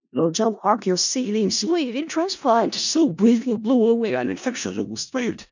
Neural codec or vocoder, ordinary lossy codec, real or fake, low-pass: codec, 16 kHz in and 24 kHz out, 0.4 kbps, LongCat-Audio-Codec, four codebook decoder; none; fake; 7.2 kHz